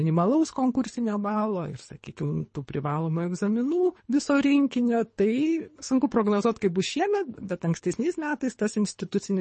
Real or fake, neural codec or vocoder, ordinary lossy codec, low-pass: fake; codec, 24 kHz, 3 kbps, HILCodec; MP3, 32 kbps; 10.8 kHz